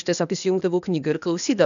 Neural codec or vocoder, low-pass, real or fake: codec, 16 kHz, 0.8 kbps, ZipCodec; 7.2 kHz; fake